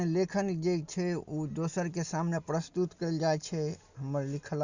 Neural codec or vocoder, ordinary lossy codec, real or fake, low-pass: none; Opus, 64 kbps; real; 7.2 kHz